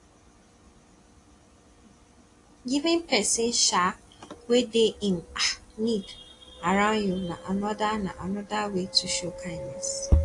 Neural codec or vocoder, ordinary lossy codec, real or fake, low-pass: none; AAC, 48 kbps; real; 10.8 kHz